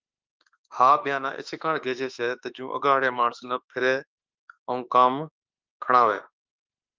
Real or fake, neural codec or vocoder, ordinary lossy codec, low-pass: fake; autoencoder, 48 kHz, 32 numbers a frame, DAC-VAE, trained on Japanese speech; Opus, 24 kbps; 7.2 kHz